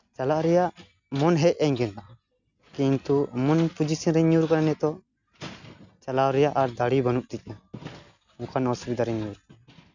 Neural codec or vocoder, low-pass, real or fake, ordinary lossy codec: none; 7.2 kHz; real; none